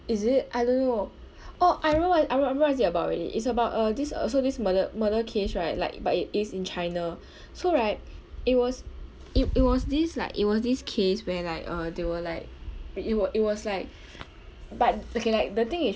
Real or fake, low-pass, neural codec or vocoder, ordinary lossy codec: real; none; none; none